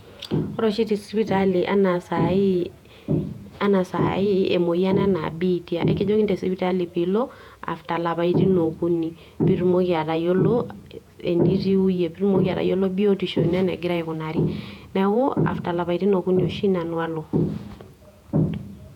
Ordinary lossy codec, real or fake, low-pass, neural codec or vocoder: none; fake; 19.8 kHz; vocoder, 48 kHz, 128 mel bands, Vocos